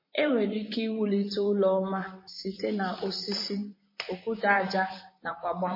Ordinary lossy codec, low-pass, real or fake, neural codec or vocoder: MP3, 24 kbps; 5.4 kHz; fake; vocoder, 44.1 kHz, 128 mel bands, Pupu-Vocoder